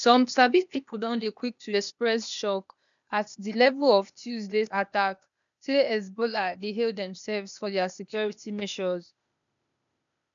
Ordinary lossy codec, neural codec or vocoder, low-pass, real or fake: MP3, 96 kbps; codec, 16 kHz, 0.8 kbps, ZipCodec; 7.2 kHz; fake